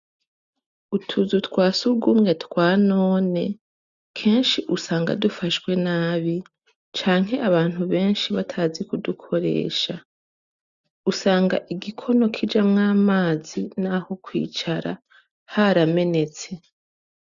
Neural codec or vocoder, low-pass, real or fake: none; 7.2 kHz; real